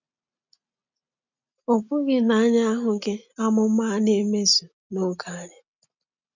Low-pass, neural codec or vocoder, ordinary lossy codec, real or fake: 7.2 kHz; none; none; real